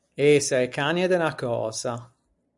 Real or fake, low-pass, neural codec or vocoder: real; 10.8 kHz; none